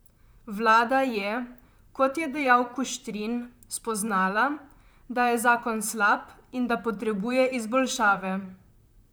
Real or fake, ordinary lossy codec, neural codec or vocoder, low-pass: fake; none; vocoder, 44.1 kHz, 128 mel bands, Pupu-Vocoder; none